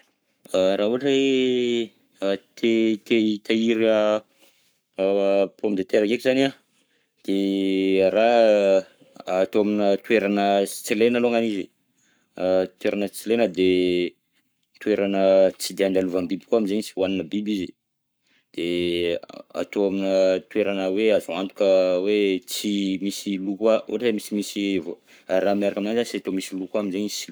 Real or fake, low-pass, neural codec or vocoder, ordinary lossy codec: fake; none; codec, 44.1 kHz, 7.8 kbps, Pupu-Codec; none